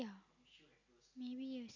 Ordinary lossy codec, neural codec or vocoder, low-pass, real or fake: none; none; 7.2 kHz; real